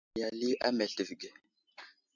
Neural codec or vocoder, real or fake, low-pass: none; real; 7.2 kHz